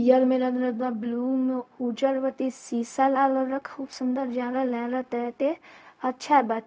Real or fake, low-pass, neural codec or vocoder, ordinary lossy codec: fake; none; codec, 16 kHz, 0.4 kbps, LongCat-Audio-Codec; none